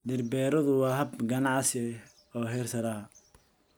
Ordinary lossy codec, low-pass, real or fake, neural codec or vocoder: none; none; real; none